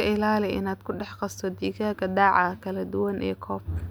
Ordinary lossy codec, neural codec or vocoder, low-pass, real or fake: none; none; none; real